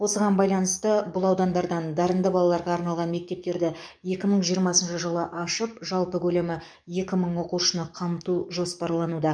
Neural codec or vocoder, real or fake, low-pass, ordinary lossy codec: codec, 44.1 kHz, 7.8 kbps, DAC; fake; 9.9 kHz; none